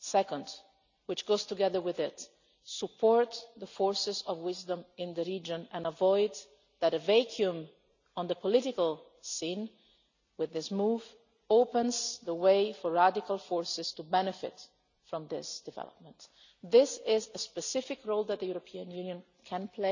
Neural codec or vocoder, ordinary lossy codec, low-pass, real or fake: none; none; 7.2 kHz; real